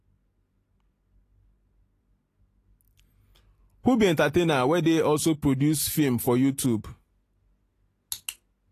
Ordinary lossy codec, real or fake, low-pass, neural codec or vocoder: AAC, 48 kbps; real; 14.4 kHz; none